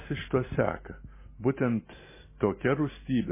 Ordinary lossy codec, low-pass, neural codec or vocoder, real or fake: MP3, 16 kbps; 3.6 kHz; none; real